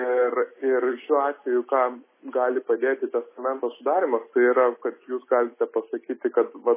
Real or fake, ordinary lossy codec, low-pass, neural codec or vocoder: real; MP3, 16 kbps; 3.6 kHz; none